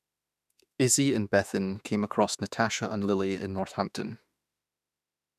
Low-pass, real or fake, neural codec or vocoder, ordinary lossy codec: 14.4 kHz; fake; autoencoder, 48 kHz, 32 numbers a frame, DAC-VAE, trained on Japanese speech; none